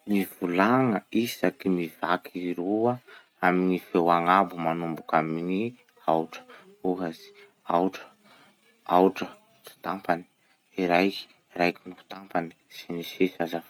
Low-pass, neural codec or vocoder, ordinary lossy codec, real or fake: 19.8 kHz; none; none; real